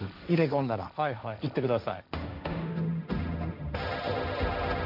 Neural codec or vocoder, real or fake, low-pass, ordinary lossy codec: codec, 16 kHz, 1.1 kbps, Voila-Tokenizer; fake; 5.4 kHz; none